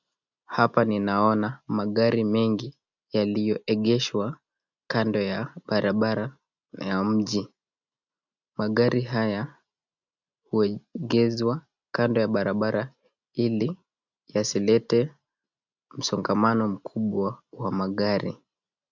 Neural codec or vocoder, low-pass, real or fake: none; 7.2 kHz; real